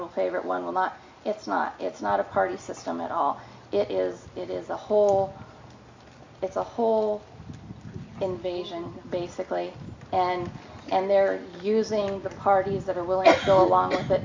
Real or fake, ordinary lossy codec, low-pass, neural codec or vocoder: real; MP3, 64 kbps; 7.2 kHz; none